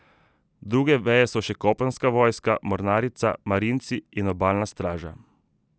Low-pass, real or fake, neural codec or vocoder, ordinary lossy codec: none; real; none; none